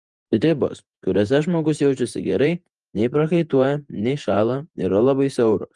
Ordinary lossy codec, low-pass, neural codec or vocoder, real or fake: Opus, 32 kbps; 10.8 kHz; vocoder, 48 kHz, 128 mel bands, Vocos; fake